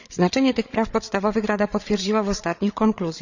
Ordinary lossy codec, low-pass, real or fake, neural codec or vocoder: none; 7.2 kHz; fake; codec, 16 kHz, 8 kbps, FreqCodec, larger model